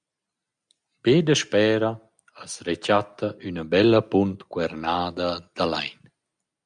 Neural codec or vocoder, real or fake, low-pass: none; real; 9.9 kHz